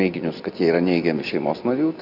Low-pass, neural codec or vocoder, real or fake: 5.4 kHz; vocoder, 44.1 kHz, 128 mel bands every 256 samples, BigVGAN v2; fake